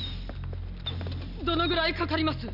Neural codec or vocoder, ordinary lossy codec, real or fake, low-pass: none; none; real; 5.4 kHz